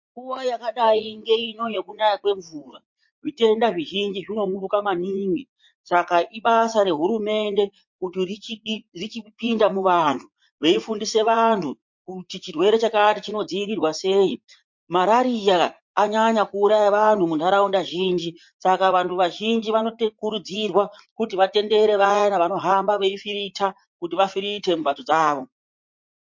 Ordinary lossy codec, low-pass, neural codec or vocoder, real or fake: MP3, 48 kbps; 7.2 kHz; vocoder, 44.1 kHz, 80 mel bands, Vocos; fake